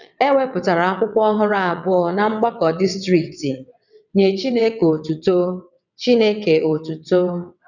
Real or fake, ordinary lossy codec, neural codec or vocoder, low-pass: fake; none; vocoder, 22.05 kHz, 80 mel bands, WaveNeXt; 7.2 kHz